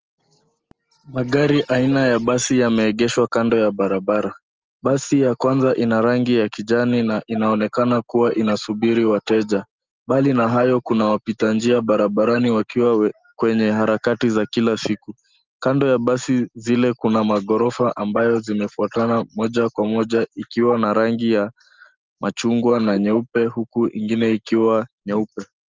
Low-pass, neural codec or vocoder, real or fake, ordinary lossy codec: 7.2 kHz; none; real; Opus, 24 kbps